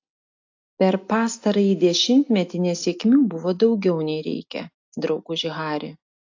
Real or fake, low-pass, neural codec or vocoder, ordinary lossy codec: real; 7.2 kHz; none; AAC, 48 kbps